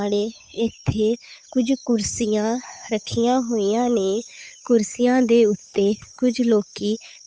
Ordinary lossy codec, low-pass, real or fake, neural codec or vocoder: none; none; fake; codec, 16 kHz, 8 kbps, FunCodec, trained on Chinese and English, 25 frames a second